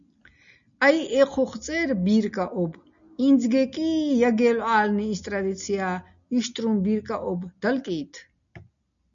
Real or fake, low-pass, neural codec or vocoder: real; 7.2 kHz; none